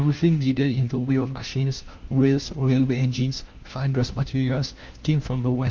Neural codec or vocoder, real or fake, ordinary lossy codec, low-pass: codec, 16 kHz, 1 kbps, FunCodec, trained on LibriTTS, 50 frames a second; fake; Opus, 32 kbps; 7.2 kHz